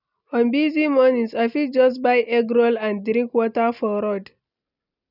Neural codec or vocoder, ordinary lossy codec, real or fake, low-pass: none; none; real; 5.4 kHz